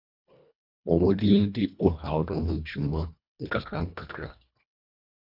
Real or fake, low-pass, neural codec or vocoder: fake; 5.4 kHz; codec, 24 kHz, 1.5 kbps, HILCodec